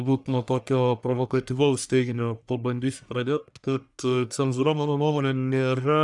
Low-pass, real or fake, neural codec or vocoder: 10.8 kHz; fake; codec, 44.1 kHz, 1.7 kbps, Pupu-Codec